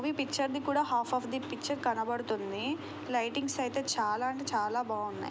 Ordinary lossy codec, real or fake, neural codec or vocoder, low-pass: none; real; none; none